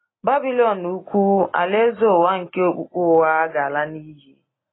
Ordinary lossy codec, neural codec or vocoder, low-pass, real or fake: AAC, 16 kbps; none; 7.2 kHz; real